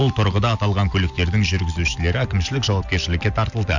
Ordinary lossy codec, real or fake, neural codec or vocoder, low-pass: none; real; none; 7.2 kHz